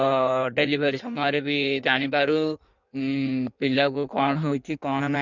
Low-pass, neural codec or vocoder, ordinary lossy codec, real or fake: 7.2 kHz; codec, 16 kHz in and 24 kHz out, 1.1 kbps, FireRedTTS-2 codec; none; fake